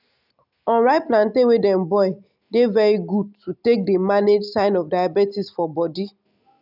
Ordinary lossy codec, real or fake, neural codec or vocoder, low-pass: none; real; none; 5.4 kHz